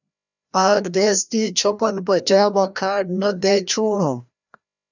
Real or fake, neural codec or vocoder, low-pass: fake; codec, 16 kHz, 1 kbps, FreqCodec, larger model; 7.2 kHz